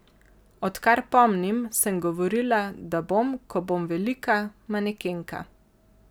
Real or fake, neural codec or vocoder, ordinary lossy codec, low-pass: real; none; none; none